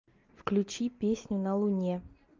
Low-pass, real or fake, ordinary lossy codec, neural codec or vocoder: 7.2 kHz; real; Opus, 24 kbps; none